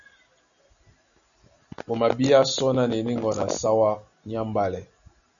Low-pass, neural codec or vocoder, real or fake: 7.2 kHz; none; real